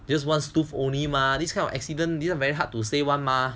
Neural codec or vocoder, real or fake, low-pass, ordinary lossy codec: none; real; none; none